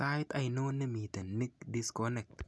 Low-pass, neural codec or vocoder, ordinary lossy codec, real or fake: none; none; none; real